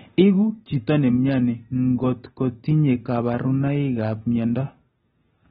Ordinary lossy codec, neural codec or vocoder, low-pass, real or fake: AAC, 16 kbps; none; 9.9 kHz; real